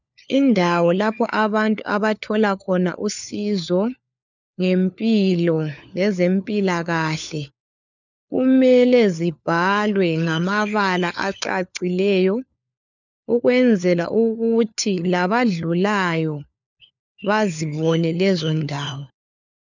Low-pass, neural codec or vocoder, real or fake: 7.2 kHz; codec, 16 kHz, 4 kbps, FunCodec, trained on LibriTTS, 50 frames a second; fake